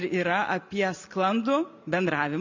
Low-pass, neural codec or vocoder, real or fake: 7.2 kHz; none; real